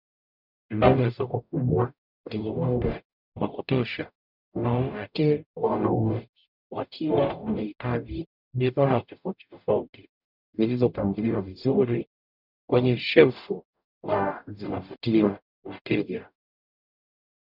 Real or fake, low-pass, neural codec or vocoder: fake; 5.4 kHz; codec, 44.1 kHz, 0.9 kbps, DAC